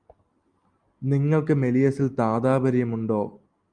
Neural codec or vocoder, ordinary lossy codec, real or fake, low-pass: none; Opus, 32 kbps; real; 9.9 kHz